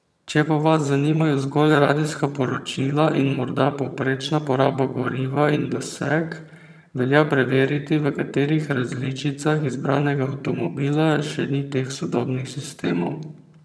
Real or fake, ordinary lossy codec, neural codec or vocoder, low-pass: fake; none; vocoder, 22.05 kHz, 80 mel bands, HiFi-GAN; none